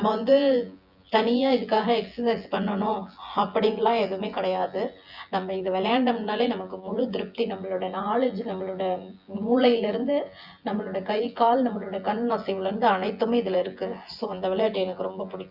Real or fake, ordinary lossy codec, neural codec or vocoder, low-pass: fake; Opus, 64 kbps; vocoder, 24 kHz, 100 mel bands, Vocos; 5.4 kHz